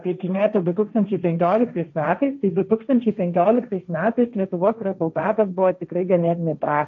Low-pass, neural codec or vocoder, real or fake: 7.2 kHz; codec, 16 kHz, 1.1 kbps, Voila-Tokenizer; fake